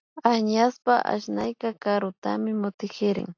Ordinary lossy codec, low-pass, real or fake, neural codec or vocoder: AAC, 48 kbps; 7.2 kHz; real; none